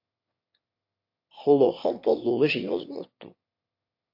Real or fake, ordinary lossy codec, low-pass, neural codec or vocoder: fake; MP3, 32 kbps; 5.4 kHz; autoencoder, 22.05 kHz, a latent of 192 numbers a frame, VITS, trained on one speaker